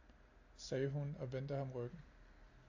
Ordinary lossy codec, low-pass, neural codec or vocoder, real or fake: AAC, 48 kbps; 7.2 kHz; none; real